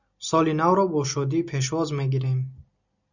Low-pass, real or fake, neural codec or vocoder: 7.2 kHz; real; none